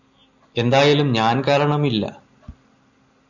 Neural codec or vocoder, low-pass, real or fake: none; 7.2 kHz; real